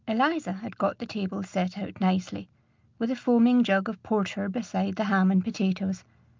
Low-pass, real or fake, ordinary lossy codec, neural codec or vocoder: 7.2 kHz; real; Opus, 24 kbps; none